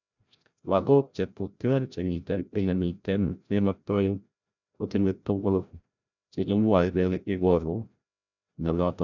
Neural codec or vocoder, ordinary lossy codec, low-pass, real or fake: codec, 16 kHz, 0.5 kbps, FreqCodec, larger model; none; 7.2 kHz; fake